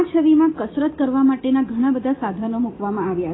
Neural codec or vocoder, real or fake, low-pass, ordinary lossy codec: none; real; 7.2 kHz; AAC, 16 kbps